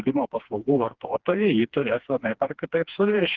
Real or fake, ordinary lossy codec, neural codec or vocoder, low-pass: fake; Opus, 16 kbps; codec, 16 kHz, 2 kbps, FreqCodec, smaller model; 7.2 kHz